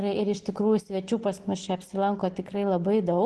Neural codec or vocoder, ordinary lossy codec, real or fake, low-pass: none; Opus, 16 kbps; real; 10.8 kHz